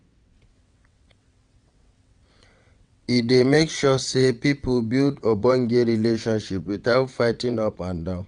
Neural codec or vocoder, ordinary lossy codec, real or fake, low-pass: vocoder, 22.05 kHz, 80 mel bands, WaveNeXt; none; fake; 9.9 kHz